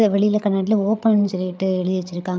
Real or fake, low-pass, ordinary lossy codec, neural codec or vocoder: fake; none; none; codec, 16 kHz, 8 kbps, FreqCodec, larger model